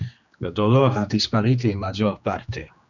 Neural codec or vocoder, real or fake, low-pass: codec, 16 kHz, 2 kbps, X-Codec, HuBERT features, trained on general audio; fake; 7.2 kHz